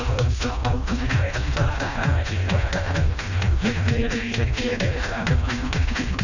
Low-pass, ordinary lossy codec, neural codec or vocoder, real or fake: 7.2 kHz; none; codec, 16 kHz, 1 kbps, FreqCodec, smaller model; fake